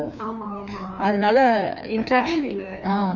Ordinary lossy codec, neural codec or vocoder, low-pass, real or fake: none; codec, 16 kHz, 2 kbps, FreqCodec, larger model; 7.2 kHz; fake